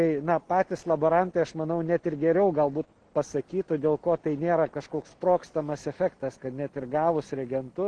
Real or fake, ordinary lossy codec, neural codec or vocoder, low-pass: real; Opus, 16 kbps; none; 9.9 kHz